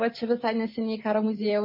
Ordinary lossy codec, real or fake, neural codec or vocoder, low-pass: MP3, 24 kbps; real; none; 5.4 kHz